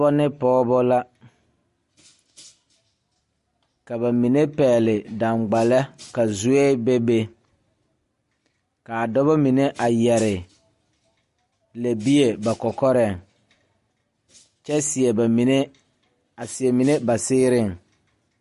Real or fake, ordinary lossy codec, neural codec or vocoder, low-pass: real; MP3, 48 kbps; none; 14.4 kHz